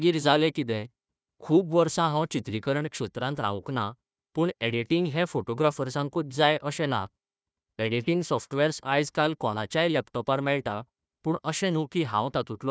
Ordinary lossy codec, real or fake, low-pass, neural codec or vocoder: none; fake; none; codec, 16 kHz, 1 kbps, FunCodec, trained on Chinese and English, 50 frames a second